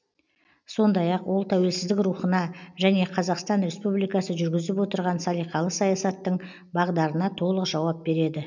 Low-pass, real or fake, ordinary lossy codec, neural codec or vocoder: 7.2 kHz; real; none; none